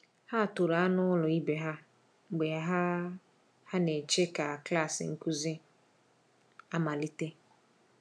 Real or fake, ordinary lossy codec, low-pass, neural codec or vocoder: real; none; none; none